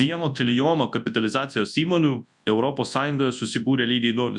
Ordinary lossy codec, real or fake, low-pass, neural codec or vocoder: MP3, 96 kbps; fake; 10.8 kHz; codec, 24 kHz, 0.9 kbps, WavTokenizer, large speech release